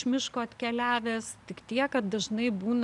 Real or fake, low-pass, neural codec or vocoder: real; 10.8 kHz; none